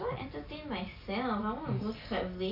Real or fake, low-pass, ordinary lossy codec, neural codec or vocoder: real; 5.4 kHz; none; none